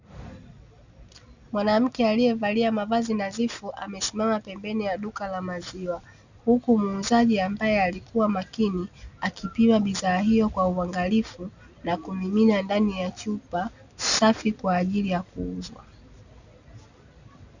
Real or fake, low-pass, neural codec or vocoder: real; 7.2 kHz; none